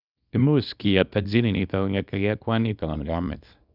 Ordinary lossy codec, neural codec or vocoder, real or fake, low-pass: none; codec, 24 kHz, 0.9 kbps, WavTokenizer, small release; fake; 5.4 kHz